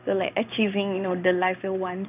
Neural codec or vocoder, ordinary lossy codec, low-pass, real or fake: codec, 16 kHz in and 24 kHz out, 1 kbps, XY-Tokenizer; none; 3.6 kHz; fake